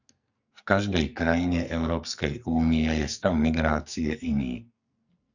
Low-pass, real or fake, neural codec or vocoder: 7.2 kHz; fake; codec, 44.1 kHz, 2.6 kbps, SNAC